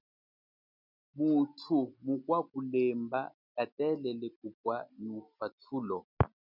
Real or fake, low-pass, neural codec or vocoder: real; 5.4 kHz; none